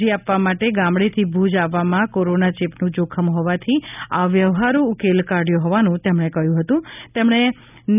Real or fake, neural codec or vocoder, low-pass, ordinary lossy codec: real; none; 3.6 kHz; none